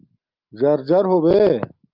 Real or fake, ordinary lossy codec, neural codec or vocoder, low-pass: real; Opus, 32 kbps; none; 5.4 kHz